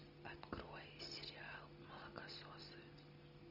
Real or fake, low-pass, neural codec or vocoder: real; 5.4 kHz; none